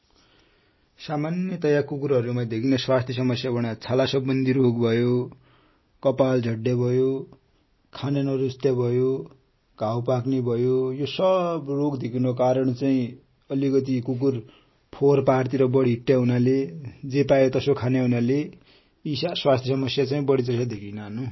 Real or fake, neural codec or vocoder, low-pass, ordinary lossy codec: real; none; 7.2 kHz; MP3, 24 kbps